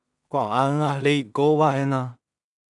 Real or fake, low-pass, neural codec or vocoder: fake; 10.8 kHz; codec, 16 kHz in and 24 kHz out, 0.4 kbps, LongCat-Audio-Codec, two codebook decoder